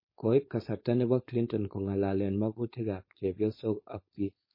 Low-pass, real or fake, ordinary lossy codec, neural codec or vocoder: 5.4 kHz; fake; MP3, 24 kbps; codec, 16 kHz, 4.8 kbps, FACodec